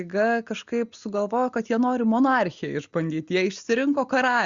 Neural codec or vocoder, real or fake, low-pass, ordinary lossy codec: none; real; 7.2 kHz; Opus, 64 kbps